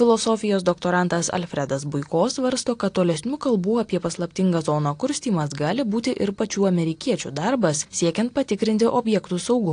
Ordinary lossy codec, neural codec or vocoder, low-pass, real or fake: AAC, 48 kbps; none; 9.9 kHz; real